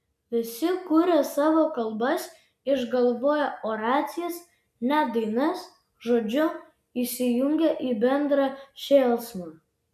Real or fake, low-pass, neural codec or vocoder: real; 14.4 kHz; none